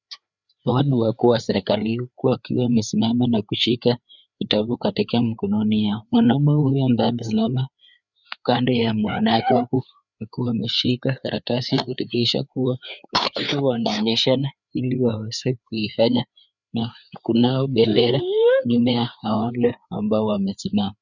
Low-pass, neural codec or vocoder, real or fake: 7.2 kHz; codec, 16 kHz, 4 kbps, FreqCodec, larger model; fake